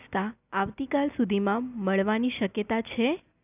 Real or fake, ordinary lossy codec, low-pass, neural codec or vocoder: real; none; 3.6 kHz; none